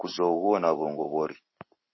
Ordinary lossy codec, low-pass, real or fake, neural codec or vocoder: MP3, 24 kbps; 7.2 kHz; real; none